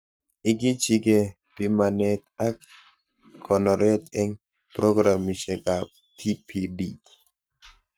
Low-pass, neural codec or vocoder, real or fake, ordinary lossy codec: none; codec, 44.1 kHz, 7.8 kbps, Pupu-Codec; fake; none